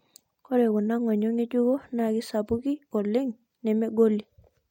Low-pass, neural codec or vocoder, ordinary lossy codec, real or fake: 19.8 kHz; none; MP3, 64 kbps; real